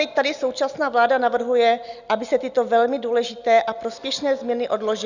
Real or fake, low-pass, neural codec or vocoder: real; 7.2 kHz; none